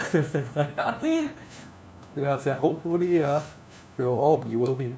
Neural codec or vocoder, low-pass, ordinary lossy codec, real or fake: codec, 16 kHz, 1 kbps, FunCodec, trained on LibriTTS, 50 frames a second; none; none; fake